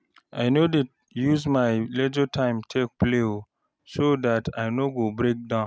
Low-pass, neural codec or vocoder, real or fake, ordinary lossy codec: none; none; real; none